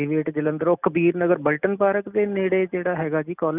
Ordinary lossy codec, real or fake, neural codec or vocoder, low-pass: none; real; none; 3.6 kHz